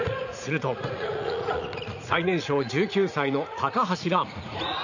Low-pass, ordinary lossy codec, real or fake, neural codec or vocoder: 7.2 kHz; none; fake; vocoder, 44.1 kHz, 80 mel bands, Vocos